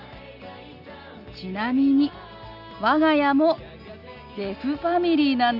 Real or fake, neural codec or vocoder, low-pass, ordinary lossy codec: real; none; 5.4 kHz; none